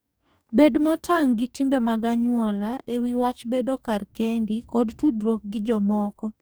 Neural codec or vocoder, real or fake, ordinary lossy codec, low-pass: codec, 44.1 kHz, 2.6 kbps, DAC; fake; none; none